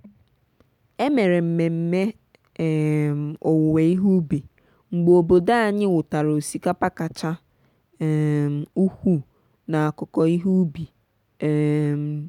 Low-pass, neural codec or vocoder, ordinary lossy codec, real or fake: 19.8 kHz; none; none; real